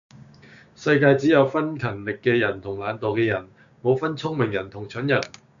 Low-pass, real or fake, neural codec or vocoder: 7.2 kHz; fake; codec, 16 kHz, 6 kbps, DAC